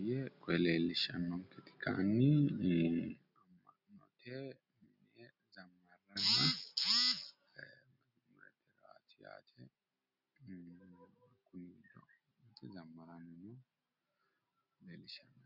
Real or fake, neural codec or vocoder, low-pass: real; none; 5.4 kHz